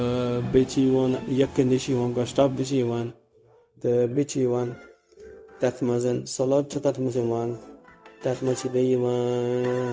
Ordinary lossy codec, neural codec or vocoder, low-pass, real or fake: none; codec, 16 kHz, 0.4 kbps, LongCat-Audio-Codec; none; fake